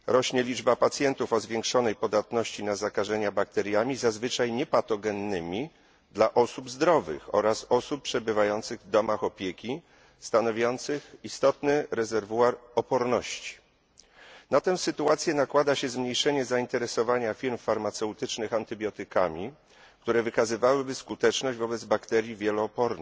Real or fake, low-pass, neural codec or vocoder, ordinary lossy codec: real; none; none; none